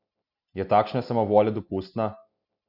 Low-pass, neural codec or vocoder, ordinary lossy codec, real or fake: 5.4 kHz; none; none; real